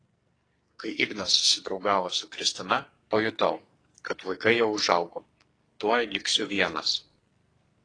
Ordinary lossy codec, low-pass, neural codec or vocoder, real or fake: AAC, 32 kbps; 9.9 kHz; codec, 44.1 kHz, 2.6 kbps, SNAC; fake